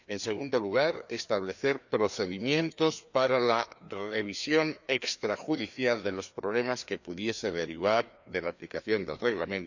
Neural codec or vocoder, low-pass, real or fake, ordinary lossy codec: codec, 16 kHz, 2 kbps, FreqCodec, larger model; 7.2 kHz; fake; none